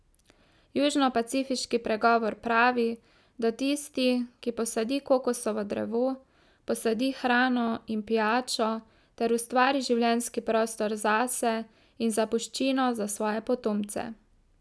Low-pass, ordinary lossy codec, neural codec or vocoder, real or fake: none; none; none; real